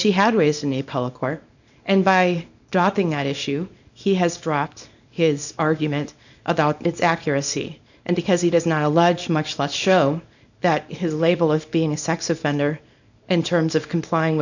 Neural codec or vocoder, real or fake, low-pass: codec, 24 kHz, 0.9 kbps, WavTokenizer, small release; fake; 7.2 kHz